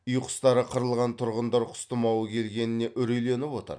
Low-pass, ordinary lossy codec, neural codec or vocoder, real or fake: 9.9 kHz; none; none; real